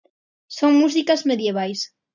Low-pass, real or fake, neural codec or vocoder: 7.2 kHz; real; none